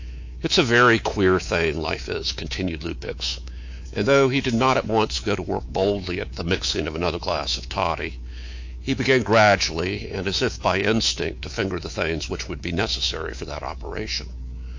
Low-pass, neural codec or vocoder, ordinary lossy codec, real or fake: 7.2 kHz; codec, 24 kHz, 3.1 kbps, DualCodec; AAC, 48 kbps; fake